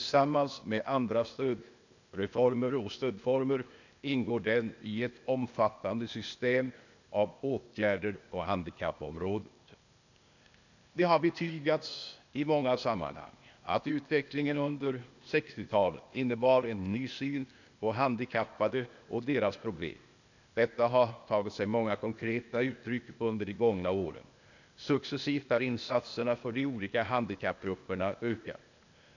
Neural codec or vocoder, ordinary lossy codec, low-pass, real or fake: codec, 16 kHz, 0.8 kbps, ZipCodec; none; 7.2 kHz; fake